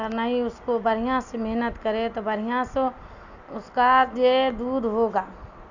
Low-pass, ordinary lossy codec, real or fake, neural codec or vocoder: 7.2 kHz; none; real; none